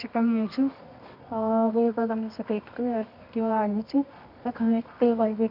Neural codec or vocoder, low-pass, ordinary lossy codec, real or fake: codec, 24 kHz, 0.9 kbps, WavTokenizer, medium music audio release; 5.4 kHz; none; fake